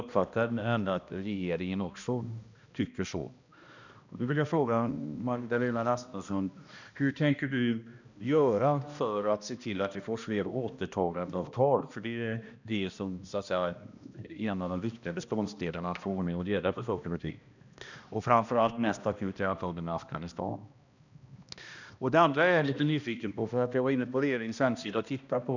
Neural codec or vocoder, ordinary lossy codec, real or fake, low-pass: codec, 16 kHz, 1 kbps, X-Codec, HuBERT features, trained on balanced general audio; none; fake; 7.2 kHz